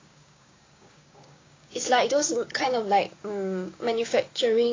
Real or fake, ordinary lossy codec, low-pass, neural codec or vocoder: fake; AAC, 32 kbps; 7.2 kHz; codec, 16 kHz, 6 kbps, DAC